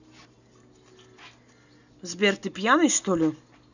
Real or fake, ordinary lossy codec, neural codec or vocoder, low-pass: real; none; none; 7.2 kHz